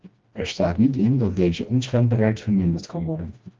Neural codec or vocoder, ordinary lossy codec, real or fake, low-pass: codec, 16 kHz, 1 kbps, FreqCodec, smaller model; Opus, 24 kbps; fake; 7.2 kHz